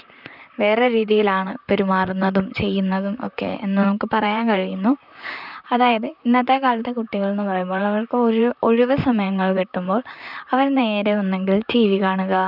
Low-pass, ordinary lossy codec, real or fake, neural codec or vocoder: 5.4 kHz; none; fake; vocoder, 44.1 kHz, 128 mel bands, Pupu-Vocoder